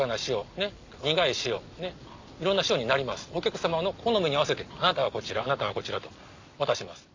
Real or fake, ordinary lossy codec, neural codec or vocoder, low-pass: real; MP3, 64 kbps; none; 7.2 kHz